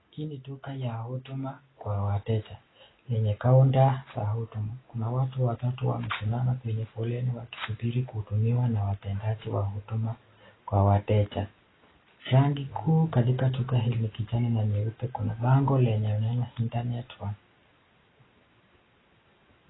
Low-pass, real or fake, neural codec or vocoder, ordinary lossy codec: 7.2 kHz; real; none; AAC, 16 kbps